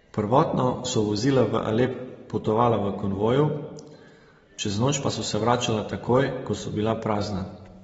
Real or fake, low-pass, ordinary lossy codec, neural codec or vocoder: real; 19.8 kHz; AAC, 24 kbps; none